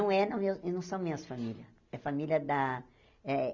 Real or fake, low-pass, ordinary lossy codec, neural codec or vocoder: real; 7.2 kHz; none; none